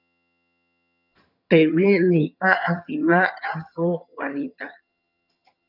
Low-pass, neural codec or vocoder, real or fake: 5.4 kHz; vocoder, 22.05 kHz, 80 mel bands, HiFi-GAN; fake